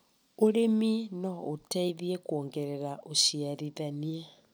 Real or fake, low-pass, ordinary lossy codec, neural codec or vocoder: real; none; none; none